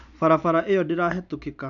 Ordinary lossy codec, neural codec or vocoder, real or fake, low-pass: none; none; real; 7.2 kHz